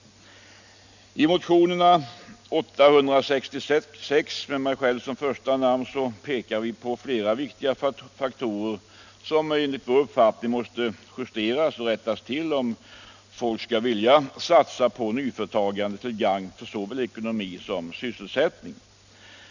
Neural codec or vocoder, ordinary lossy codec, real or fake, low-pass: none; none; real; 7.2 kHz